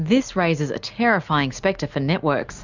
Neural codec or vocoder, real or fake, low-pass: none; real; 7.2 kHz